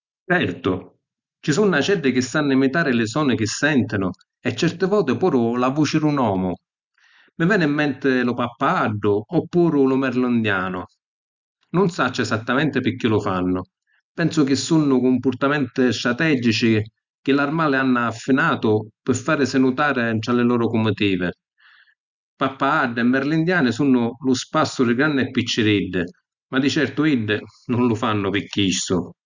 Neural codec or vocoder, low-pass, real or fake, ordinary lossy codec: none; 7.2 kHz; real; Opus, 64 kbps